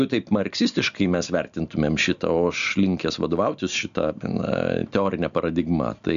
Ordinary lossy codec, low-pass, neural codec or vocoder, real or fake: AAC, 96 kbps; 7.2 kHz; none; real